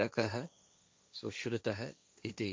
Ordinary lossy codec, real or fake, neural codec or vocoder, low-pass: none; fake; codec, 16 kHz, 1.1 kbps, Voila-Tokenizer; none